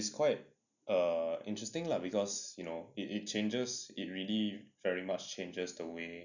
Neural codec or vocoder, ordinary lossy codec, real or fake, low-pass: none; none; real; 7.2 kHz